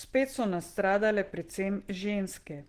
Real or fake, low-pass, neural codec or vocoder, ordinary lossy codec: real; 14.4 kHz; none; Opus, 16 kbps